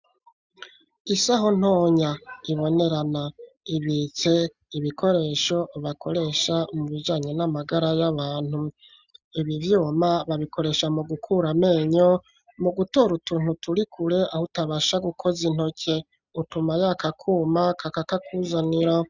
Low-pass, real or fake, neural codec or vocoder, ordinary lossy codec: 7.2 kHz; real; none; Opus, 64 kbps